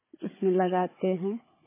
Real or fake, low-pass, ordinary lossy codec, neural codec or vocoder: fake; 3.6 kHz; MP3, 16 kbps; codec, 16 kHz, 4 kbps, FunCodec, trained on Chinese and English, 50 frames a second